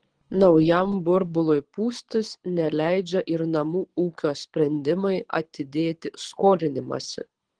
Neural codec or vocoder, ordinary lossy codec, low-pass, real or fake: codec, 24 kHz, 6 kbps, HILCodec; Opus, 16 kbps; 9.9 kHz; fake